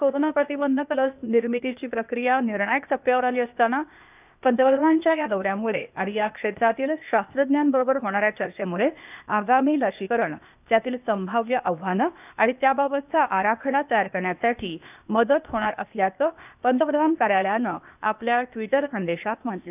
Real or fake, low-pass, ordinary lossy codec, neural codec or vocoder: fake; 3.6 kHz; none; codec, 16 kHz, 0.8 kbps, ZipCodec